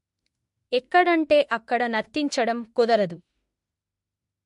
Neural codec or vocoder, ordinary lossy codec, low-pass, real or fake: autoencoder, 48 kHz, 32 numbers a frame, DAC-VAE, trained on Japanese speech; MP3, 48 kbps; 14.4 kHz; fake